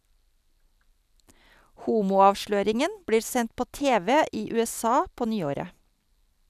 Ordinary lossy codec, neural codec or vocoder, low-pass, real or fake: none; none; 14.4 kHz; real